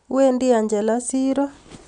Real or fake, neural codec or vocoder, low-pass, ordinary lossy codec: real; none; 9.9 kHz; none